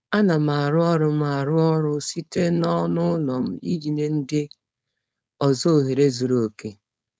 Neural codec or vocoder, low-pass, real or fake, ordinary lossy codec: codec, 16 kHz, 4.8 kbps, FACodec; none; fake; none